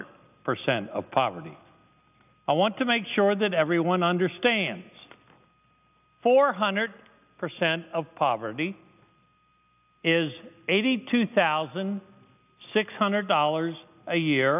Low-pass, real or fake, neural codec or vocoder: 3.6 kHz; real; none